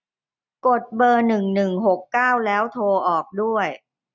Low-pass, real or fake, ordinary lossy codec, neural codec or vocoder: 7.2 kHz; real; none; none